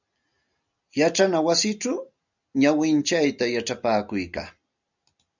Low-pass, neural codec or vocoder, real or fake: 7.2 kHz; none; real